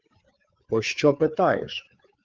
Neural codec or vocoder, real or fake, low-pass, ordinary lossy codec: codec, 16 kHz, 4 kbps, FreqCodec, larger model; fake; 7.2 kHz; Opus, 32 kbps